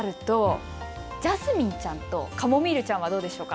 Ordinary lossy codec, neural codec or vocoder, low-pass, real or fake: none; none; none; real